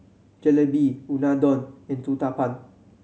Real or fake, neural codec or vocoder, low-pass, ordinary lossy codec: real; none; none; none